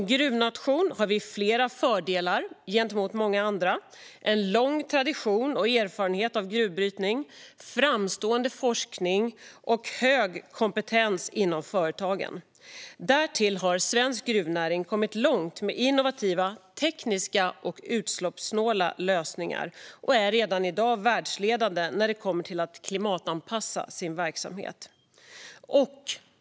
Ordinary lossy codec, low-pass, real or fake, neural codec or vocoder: none; none; real; none